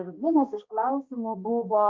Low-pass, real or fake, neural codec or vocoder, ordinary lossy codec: 7.2 kHz; fake; codec, 16 kHz, 1 kbps, X-Codec, HuBERT features, trained on balanced general audio; Opus, 24 kbps